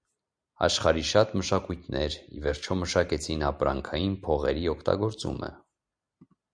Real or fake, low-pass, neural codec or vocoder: real; 9.9 kHz; none